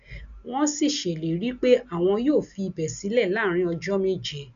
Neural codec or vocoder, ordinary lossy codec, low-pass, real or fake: none; none; 7.2 kHz; real